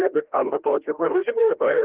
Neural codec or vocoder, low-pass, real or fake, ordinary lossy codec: codec, 16 kHz, 1 kbps, FreqCodec, larger model; 3.6 kHz; fake; Opus, 16 kbps